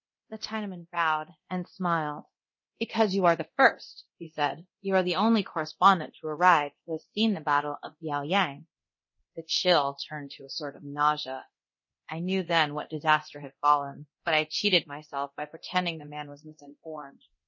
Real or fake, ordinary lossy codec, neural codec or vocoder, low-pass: fake; MP3, 32 kbps; codec, 24 kHz, 0.9 kbps, DualCodec; 7.2 kHz